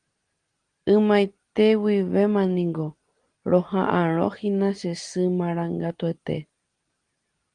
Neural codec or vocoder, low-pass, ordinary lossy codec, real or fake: none; 9.9 kHz; Opus, 24 kbps; real